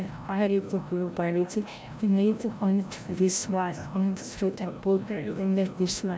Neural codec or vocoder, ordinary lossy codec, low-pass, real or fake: codec, 16 kHz, 0.5 kbps, FreqCodec, larger model; none; none; fake